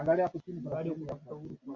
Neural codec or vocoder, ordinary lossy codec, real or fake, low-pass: none; Opus, 64 kbps; real; 7.2 kHz